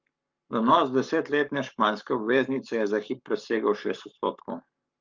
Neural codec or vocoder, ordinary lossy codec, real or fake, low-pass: codec, 44.1 kHz, 7.8 kbps, Pupu-Codec; Opus, 24 kbps; fake; 7.2 kHz